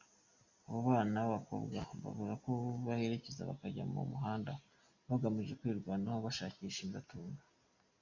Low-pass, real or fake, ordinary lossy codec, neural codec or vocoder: 7.2 kHz; real; MP3, 48 kbps; none